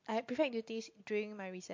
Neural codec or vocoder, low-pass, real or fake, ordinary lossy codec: none; 7.2 kHz; real; MP3, 48 kbps